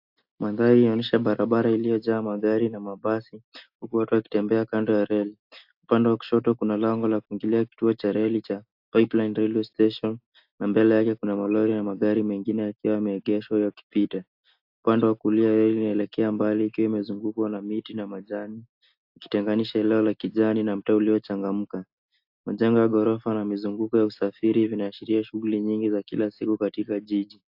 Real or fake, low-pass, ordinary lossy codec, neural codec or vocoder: real; 5.4 kHz; AAC, 48 kbps; none